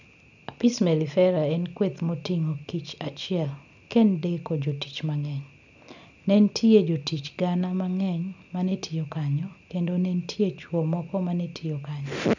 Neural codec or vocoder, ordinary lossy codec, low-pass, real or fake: none; none; 7.2 kHz; real